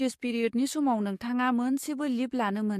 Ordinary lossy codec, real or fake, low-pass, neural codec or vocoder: MP3, 64 kbps; fake; 14.4 kHz; codec, 44.1 kHz, 7.8 kbps, DAC